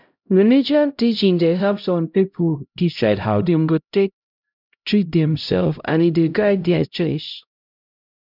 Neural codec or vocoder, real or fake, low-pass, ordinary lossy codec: codec, 16 kHz, 0.5 kbps, X-Codec, HuBERT features, trained on LibriSpeech; fake; 5.4 kHz; none